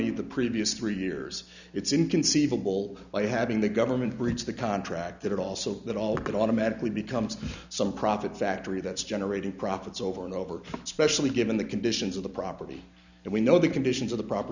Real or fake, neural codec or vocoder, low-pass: real; none; 7.2 kHz